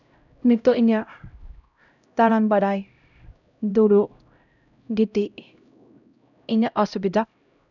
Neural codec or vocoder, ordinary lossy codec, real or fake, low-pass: codec, 16 kHz, 0.5 kbps, X-Codec, HuBERT features, trained on LibriSpeech; none; fake; 7.2 kHz